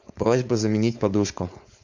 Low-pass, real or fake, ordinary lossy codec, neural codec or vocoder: 7.2 kHz; fake; MP3, 64 kbps; codec, 16 kHz, 4.8 kbps, FACodec